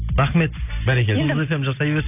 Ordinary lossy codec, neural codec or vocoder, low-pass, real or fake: none; none; 3.6 kHz; real